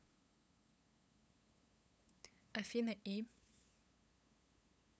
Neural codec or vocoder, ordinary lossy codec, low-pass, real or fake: codec, 16 kHz, 8 kbps, FunCodec, trained on LibriTTS, 25 frames a second; none; none; fake